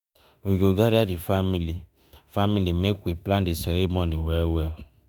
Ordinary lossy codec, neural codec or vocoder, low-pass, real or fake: none; autoencoder, 48 kHz, 32 numbers a frame, DAC-VAE, trained on Japanese speech; none; fake